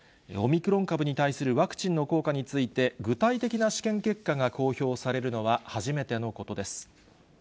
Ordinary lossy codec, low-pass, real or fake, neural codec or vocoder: none; none; real; none